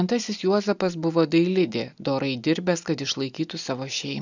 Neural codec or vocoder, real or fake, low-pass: none; real; 7.2 kHz